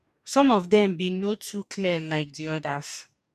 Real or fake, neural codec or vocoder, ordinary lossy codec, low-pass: fake; codec, 44.1 kHz, 2.6 kbps, DAC; none; 14.4 kHz